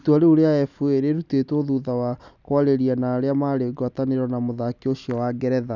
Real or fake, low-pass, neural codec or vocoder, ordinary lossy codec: real; 7.2 kHz; none; none